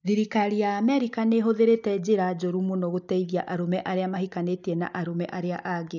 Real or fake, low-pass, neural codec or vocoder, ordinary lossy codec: real; 7.2 kHz; none; none